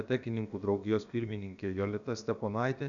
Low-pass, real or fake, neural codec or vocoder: 7.2 kHz; fake; codec, 16 kHz, about 1 kbps, DyCAST, with the encoder's durations